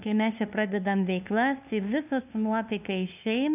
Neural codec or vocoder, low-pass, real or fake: codec, 16 kHz, 2 kbps, FunCodec, trained on LibriTTS, 25 frames a second; 3.6 kHz; fake